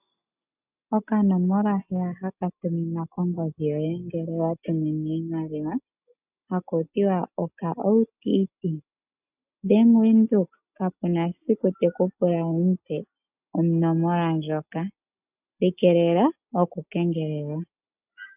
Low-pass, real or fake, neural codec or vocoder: 3.6 kHz; real; none